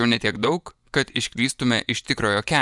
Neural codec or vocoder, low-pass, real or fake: vocoder, 24 kHz, 100 mel bands, Vocos; 10.8 kHz; fake